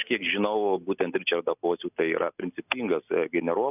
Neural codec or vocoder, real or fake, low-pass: none; real; 3.6 kHz